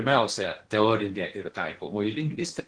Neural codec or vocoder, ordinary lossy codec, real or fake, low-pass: codec, 16 kHz in and 24 kHz out, 0.8 kbps, FocalCodec, streaming, 65536 codes; Opus, 16 kbps; fake; 9.9 kHz